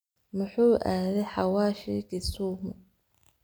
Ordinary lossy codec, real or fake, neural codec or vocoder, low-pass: none; real; none; none